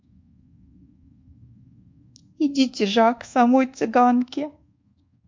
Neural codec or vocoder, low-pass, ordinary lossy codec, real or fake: codec, 24 kHz, 1.2 kbps, DualCodec; 7.2 kHz; MP3, 48 kbps; fake